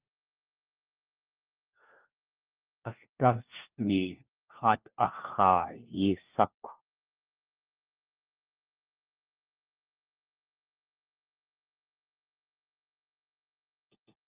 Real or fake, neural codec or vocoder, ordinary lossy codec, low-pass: fake; codec, 16 kHz, 1 kbps, FunCodec, trained on LibriTTS, 50 frames a second; Opus, 24 kbps; 3.6 kHz